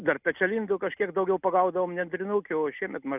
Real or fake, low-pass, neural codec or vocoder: real; 3.6 kHz; none